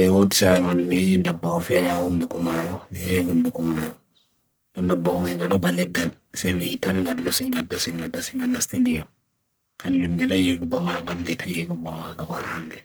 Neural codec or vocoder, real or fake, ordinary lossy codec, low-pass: codec, 44.1 kHz, 1.7 kbps, Pupu-Codec; fake; none; none